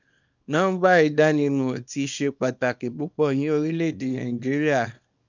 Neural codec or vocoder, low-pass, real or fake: codec, 24 kHz, 0.9 kbps, WavTokenizer, small release; 7.2 kHz; fake